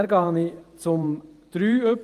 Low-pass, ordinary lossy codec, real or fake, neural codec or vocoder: 14.4 kHz; Opus, 32 kbps; fake; vocoder, 48 kHz, 128 mel bands, Vocos